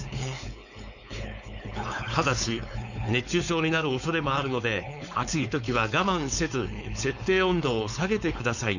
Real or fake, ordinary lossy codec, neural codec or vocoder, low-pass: fake; none; codec, 16 kHz, 4.8 kbps, FACodec; 7.2 kHz